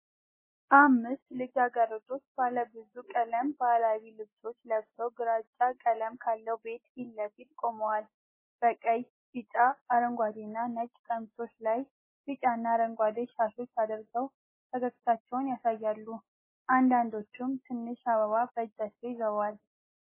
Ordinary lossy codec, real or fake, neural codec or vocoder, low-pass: MP3, 16 kbps; real; none; 3.6 kHz